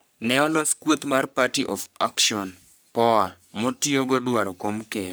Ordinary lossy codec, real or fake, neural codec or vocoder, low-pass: none; fake; codec, 44.1 kHz, 3.4 kbps, Pupu-Codec; none